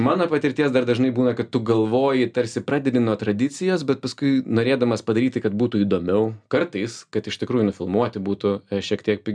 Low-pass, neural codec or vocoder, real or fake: 9.9 kHz; none; real